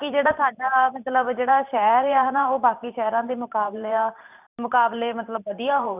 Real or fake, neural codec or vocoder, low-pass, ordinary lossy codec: fake; vocoder, 44.1 kHz, 128 mel bands every 512 samples, BigVGAN v2; 3.6 kHz; none